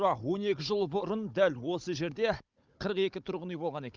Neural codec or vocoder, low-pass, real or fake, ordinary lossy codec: codec, 16 kHz, 16 kbps, FunCodec, trained on Chinese and English, 50 frames a second; 7.2 kHz; fake; Opus, 24 kbps